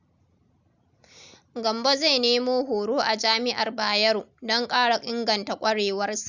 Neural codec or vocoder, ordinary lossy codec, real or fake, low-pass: none; Opus, 64 kbps; real; 7.2 kHz